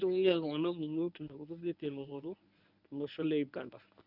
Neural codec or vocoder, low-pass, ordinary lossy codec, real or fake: codec, 24 kHz, 0.9 kbps, WavTokenizer, medium speech release version 1; 5.4 kHz; none; fake